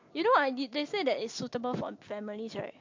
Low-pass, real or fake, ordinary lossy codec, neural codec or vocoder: 7.2 kHz; real; MP3, 48 kbps; none